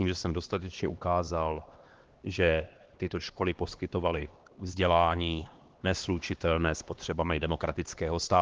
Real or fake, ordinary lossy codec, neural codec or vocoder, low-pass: fake; Opus, 16 kbps; codec, 16 kHz, 2 kbps, X-Codec, HuBERT features, trained on LibriSpeech; 7.2 kHz